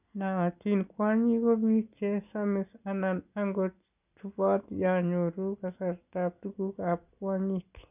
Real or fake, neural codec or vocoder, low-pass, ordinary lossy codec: real; none; 3.6 kHz; none